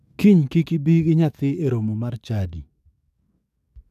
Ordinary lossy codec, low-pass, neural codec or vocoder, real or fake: none; 14.4 kHz; codec, 44.1 kHz, 7.8 kbps, DAC; fake